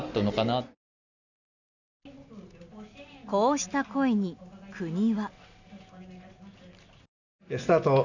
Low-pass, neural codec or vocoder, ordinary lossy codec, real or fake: 7.2 kHz; none; none; real